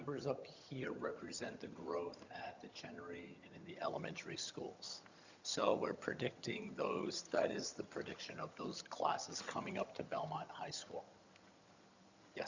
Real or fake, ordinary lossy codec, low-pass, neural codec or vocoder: fake; Opus, 64 kbps; 7.2 kHz; vocoder, 22.05 kHz, 80 mel bands, HiFi-GAN